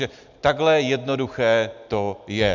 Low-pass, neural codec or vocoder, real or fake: 7.2 kHz; none; real